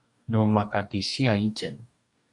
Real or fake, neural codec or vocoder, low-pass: fake; codec, 44.1 kHz, 2.6 kbps, DAC; 10.8 kHz